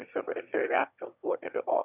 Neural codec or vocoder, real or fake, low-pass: autoencoder, 22.05 kHz, a latent of 192 numbers a frame, VITS, trained on one speaker; fake; 3.6 kHz